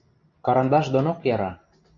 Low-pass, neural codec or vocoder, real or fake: 7.2 kHz; none; real